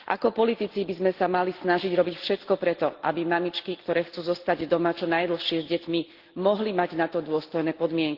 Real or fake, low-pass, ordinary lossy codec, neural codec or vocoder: real; 5.4 kHz; Opus, 16 kbps; none